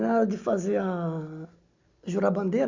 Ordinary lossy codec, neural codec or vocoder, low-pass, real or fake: Opus, 64 kbps; none; 7.2 kHz; real